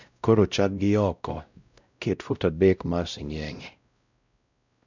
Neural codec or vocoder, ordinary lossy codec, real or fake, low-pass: codec, 16 kHz, 0.5 kbps, X-Codec, WavLM features, trained on Multilingual LibriSpeech; none; fake; 7.2 kHz